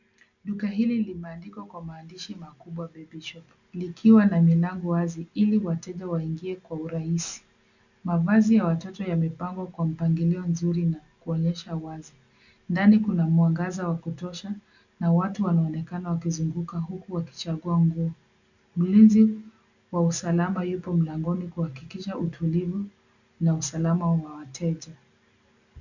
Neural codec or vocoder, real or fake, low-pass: none; real; 7.2 kHz